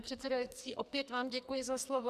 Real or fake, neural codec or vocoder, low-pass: fake; codec, 44.1 kHz, 2.6 kbps, SNAC; 14.4 kHz